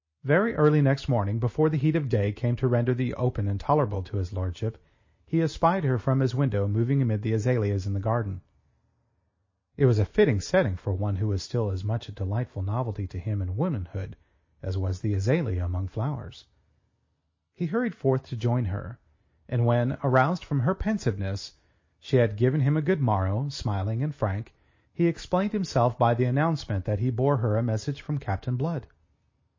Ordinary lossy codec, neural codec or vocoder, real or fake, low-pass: MP3, 32 kbps; none; real; 7.2 kHz